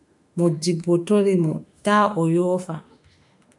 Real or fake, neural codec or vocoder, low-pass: fake; autoencoder, 48 kHz, 32 numbers a frame, DAC-VAE, trained on Japanese speech; 10.8 kHz